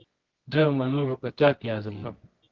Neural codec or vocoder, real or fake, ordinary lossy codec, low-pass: codec, 24 kHz, 0.9 kbps, WavTokenizer, medium music audio release; fake; Opus, 16 kbps; 7.2 kHz